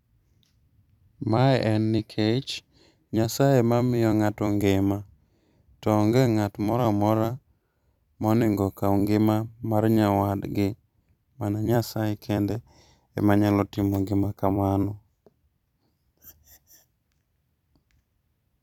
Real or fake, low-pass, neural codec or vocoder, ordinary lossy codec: fake; 19.8 kHz; vocoder, 44.1 kHz, 128 mel bands every 256 samples, BigVGAN v2; none